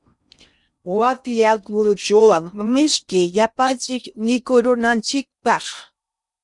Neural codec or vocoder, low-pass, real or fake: codec, 16 kHz in and 24 kHz out, 0.6 kbps, FocalCodec, streaming, 4096 codes; 10.8 kHz; fake